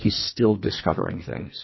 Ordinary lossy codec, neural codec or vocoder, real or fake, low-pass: MP3, 24 kbps; codec, 44.1 kHz, 2.6 kbps, SNAC; fake; 7.2 kHz